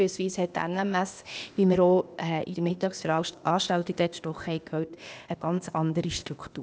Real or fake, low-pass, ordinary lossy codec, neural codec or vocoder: fake; none; none; codec, 16 kHz, 0.8 kbps, ZipCodec